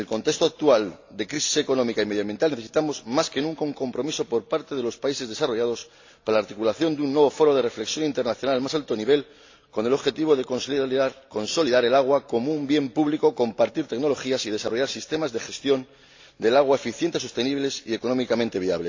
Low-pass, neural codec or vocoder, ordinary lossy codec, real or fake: 7.2 kHz; none; AAC, 48 kbps; real